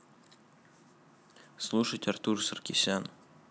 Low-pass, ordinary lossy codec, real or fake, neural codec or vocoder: none; none; real; none